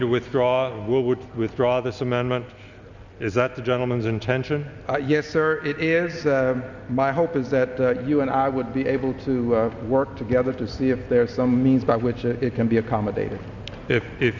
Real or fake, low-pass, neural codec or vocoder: real; 7.2 kHz; none